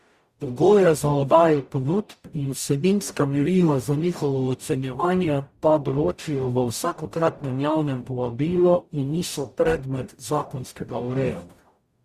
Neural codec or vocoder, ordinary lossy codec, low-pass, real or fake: codec, 44.1 kHz, 0.9 kbps, DAC; Opus, 64 kbps; 14.4 kHz; fake